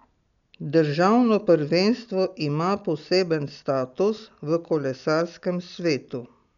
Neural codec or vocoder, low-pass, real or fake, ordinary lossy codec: none; 7.2 kHz; real; none